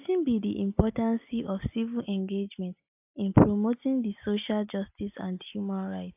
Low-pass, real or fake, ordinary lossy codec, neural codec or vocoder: 3.6 kHz; real; AAC, 32 kbps; none